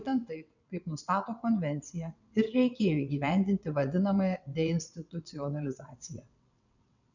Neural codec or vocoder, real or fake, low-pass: vocoder, 22.05 kHz, 80 mel bands, WaveNeXt; fake; 7.2 kHz